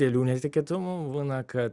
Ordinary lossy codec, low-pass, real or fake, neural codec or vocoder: Opus, 64 kbps; 10.8 kHz; real; none